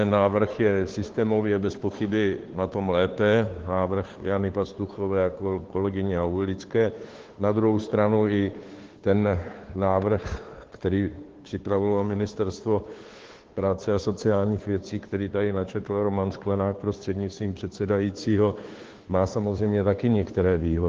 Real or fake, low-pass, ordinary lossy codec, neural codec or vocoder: fake; 7.2 kHz; Opus, 16 kbps; codec, 16 kHz, 2 kbps, FunCodec, trained on Chinese and English, 25 frames a second